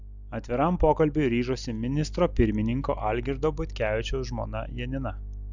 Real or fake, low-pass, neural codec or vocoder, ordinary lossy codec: real; 7.2 kHz; none; Opus, 64 kbps